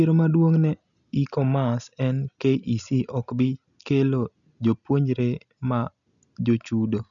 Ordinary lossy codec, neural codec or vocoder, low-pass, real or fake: none; none; 7.2 kHz; real